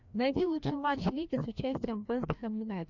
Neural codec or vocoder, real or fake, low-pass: codec, 16 kHz, 1 kbps, FreqCodec, larger model; fake; 7.2 kHz